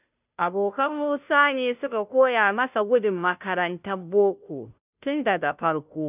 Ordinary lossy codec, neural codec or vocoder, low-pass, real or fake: none; codec, 16 kHz, 0.5 kbps, FunCodec, trained on Chinese and English, 25 frames a second; 3.6 kHz; fake